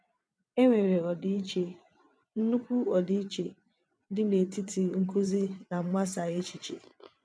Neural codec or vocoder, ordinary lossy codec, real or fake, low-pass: vocoder, 22.05 kHz, 80 mel bands, WaveNeXt; none; fake; none